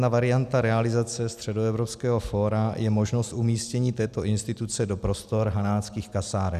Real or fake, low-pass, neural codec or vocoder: real; 14.4 kHz; none